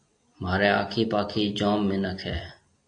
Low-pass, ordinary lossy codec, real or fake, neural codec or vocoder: 9.9 kHz; AAC, 48 kbps; real; none